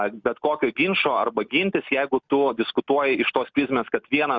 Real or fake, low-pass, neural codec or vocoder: real; 7.2 kHz; none